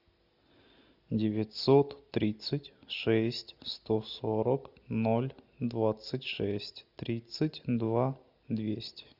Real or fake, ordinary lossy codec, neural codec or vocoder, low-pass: real; Opus, 64 kbps; none; 5.4 kHz